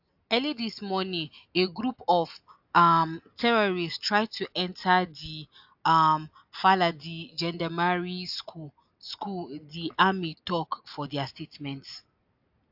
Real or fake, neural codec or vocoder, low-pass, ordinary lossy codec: real; none; 5.4 kHz; AAC, 48 kbps